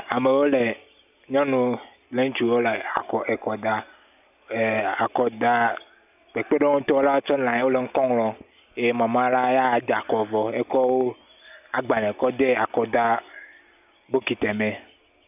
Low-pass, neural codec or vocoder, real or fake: 3.6 kHz; none; real